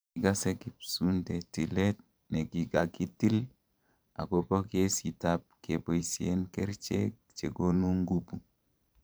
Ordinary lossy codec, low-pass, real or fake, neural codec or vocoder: none; none; fake; vocoder, 44.1 kHz, 128 mel bands every 512 samples, BigVGAN v2